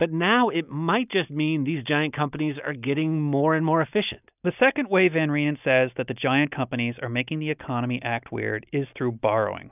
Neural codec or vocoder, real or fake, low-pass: none; real; 3.6 kHz